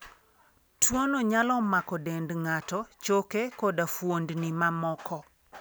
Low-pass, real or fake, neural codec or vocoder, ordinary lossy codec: none; real; none; none